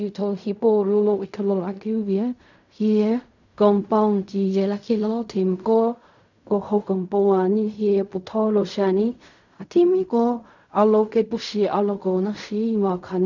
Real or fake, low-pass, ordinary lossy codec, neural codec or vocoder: fake; 7.2 kHz; none; codec, 16 kHz in and 24 kHz out, 0.4 kbps, LongCat-Audio-Codec, fine tuned four codebook decoder